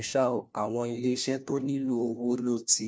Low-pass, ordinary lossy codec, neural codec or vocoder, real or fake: none; none; codec, 16 kHz, 1 kbps, FunCodec, trained on LibriTTS, 50 frames a second; fake